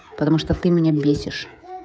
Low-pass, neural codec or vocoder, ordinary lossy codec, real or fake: none; codec, 16 kHz, 16 kbps, FreqCodec, smaller model; none; fake